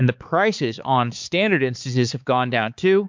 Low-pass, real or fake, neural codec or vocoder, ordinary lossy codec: 7.2 kHz; fake; codec, 16 kHz, 4 kbps, X-Codec, HuBERT features, trained on balanced general audio; MP3, 64 kbps